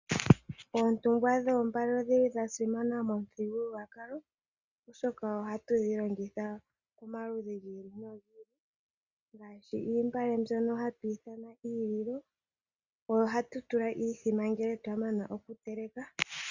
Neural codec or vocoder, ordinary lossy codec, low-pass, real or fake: none; Opus, 64 kbps; 7.2 kHz; real